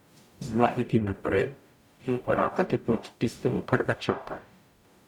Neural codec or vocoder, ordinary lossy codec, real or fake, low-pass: codec, 44.1 kHz, 0.9 kbps, DAC; none; fake; 19.8 kHz